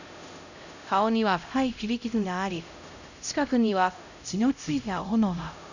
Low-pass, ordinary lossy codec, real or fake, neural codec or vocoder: 7.2 kHz; none; fake; codec, 16 kHz, 0.5 kbps, X-Codec, HuBERT features, trained on LibriSpeech